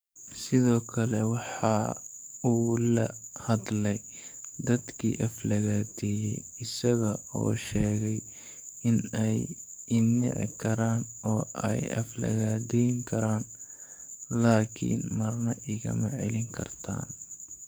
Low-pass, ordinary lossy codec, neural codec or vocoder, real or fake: none; none; codec, 44.1 kHz, 7.8 kbps, DAC; fake